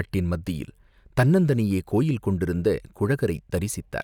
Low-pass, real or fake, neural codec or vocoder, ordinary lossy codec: 19.8 kHz; real; none; none